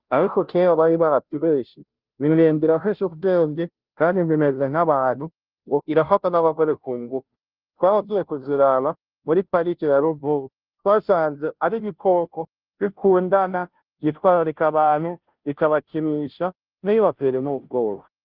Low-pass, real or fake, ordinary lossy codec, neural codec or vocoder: 5.4 kHz; fake; Opus, 32 kbps; codec, 16 kHz, 0.5 kbps, FunCodec, trained on Chinese and English, 25 frames a second